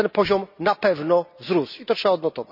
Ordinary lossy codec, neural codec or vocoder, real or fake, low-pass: none; none; real; 5.4 kHz